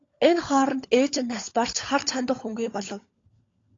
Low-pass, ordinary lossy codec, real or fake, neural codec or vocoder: 7.2 kHz; AAC, 32 kbps; fake; codec, 16 kHz, 16 kbps, FunCodec, trained on LibriTTS, 50 frames a second